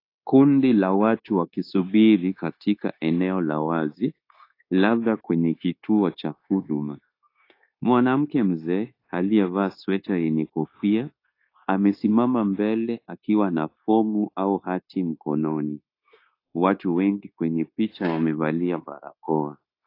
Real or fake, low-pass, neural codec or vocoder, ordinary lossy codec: fake; 5.4 kHz; codec, 16 kHz, 0.9 kbps, LongCat-Audio-Codec; AAC, 32 kbps